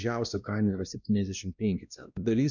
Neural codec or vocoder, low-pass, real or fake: codec, 16 kHz, 1 kbps, X-Codec, WavLM features, trained on Multilingual LibriSpeech; 7.2 kHz; fake